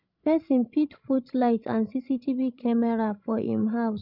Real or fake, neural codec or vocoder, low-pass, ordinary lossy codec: fake; codec, 16 kHz, 16 kbps, FreqCodec, larger model; 5.4 kHz; none